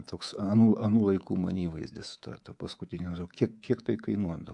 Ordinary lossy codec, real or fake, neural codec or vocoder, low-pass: AAC, 64 kbps; fake; codec, 24 kHz, 3.1 kbps, DualCodec; 10.8 kHz